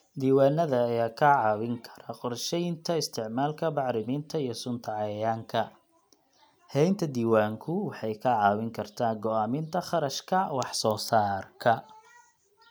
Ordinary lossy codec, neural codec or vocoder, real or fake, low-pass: none; none; real; none